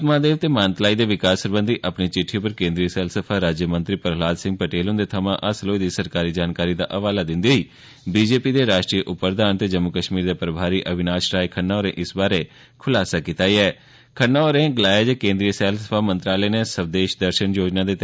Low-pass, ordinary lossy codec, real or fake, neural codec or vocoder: none; none; real; none